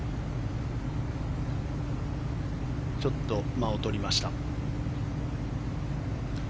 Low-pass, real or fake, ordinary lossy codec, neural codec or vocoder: none; real; none; none